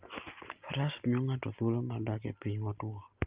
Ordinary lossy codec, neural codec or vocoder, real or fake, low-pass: Opus, 32 kbps; none; real; 3.6 kHz